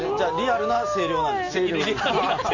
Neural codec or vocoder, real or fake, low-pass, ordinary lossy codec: none; real; 7.2 kHz; none